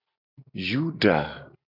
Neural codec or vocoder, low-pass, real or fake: none; 5.4 kHz; real